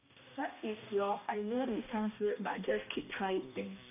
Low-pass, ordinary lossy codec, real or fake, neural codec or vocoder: 3.6 kHz; none; fake; codec, 16 kHz, 1 kbps, X-Codec, HuBERT features, trained on balanced general audio